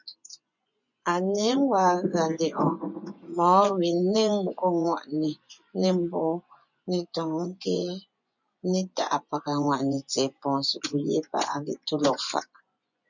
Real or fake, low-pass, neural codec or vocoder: fake; 7.2 kHz; vocoder, 24 kHz, 100 mel bands, Vocos